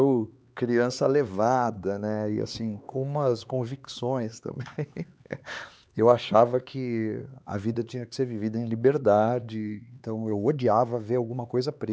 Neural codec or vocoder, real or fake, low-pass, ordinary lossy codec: codec, 16 kHz, 4 kbps, X-Codec, HuBERT features, trained on LibriSpeech; fake; none; none